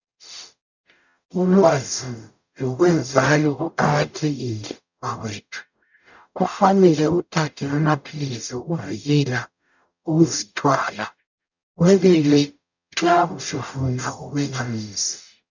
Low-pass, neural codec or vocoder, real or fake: 7.2 kHz; codec, 44.1 kHz, 0.9 kbps, DAC; fake